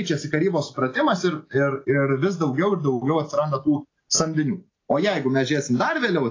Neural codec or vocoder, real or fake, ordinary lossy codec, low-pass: none; real; AAC, 32 kbps; 7.2 kHz